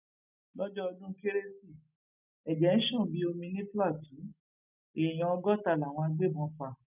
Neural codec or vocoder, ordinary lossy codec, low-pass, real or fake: none; none; 3.6 kHz; real